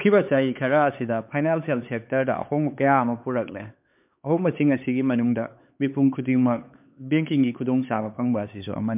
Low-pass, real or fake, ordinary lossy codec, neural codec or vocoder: 3.6 kHz; fake; MP3, 32 kbps; codec, 16 kHz, 4 kbps, X-Codec, HuBERT features, trained on LibriSpeech